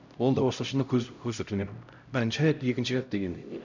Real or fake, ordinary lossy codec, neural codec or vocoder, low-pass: fake; none; codec, 16 kHz, 0.5 kbps, X-Codec, HuBERT features, trained on LibriSpeech; 7.2 kHz